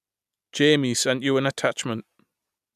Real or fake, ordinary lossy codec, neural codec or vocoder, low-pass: real; none; none; 14.4 kHz